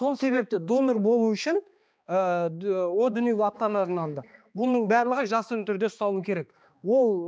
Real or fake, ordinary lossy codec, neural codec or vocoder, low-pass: fake; none; codec, 16 kHz, 2 kbps, X-Codec, HuBERT features, trained on balanced general audio; none